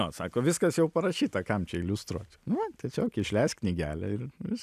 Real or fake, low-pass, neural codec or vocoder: real; 14.4 kHz; none